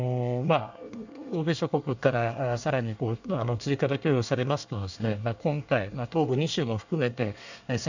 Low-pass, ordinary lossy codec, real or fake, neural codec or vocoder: 7.2 kHz; none; fake; codec, 24 kHz, 1 kbps, SNAC